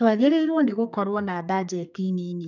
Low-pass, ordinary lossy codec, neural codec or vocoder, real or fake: 7.2 kHz; none; codec, 32 kHz, 1.9 kbps, SNAC; fake